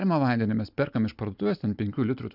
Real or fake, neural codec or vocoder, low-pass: real; none; 5.4 kHz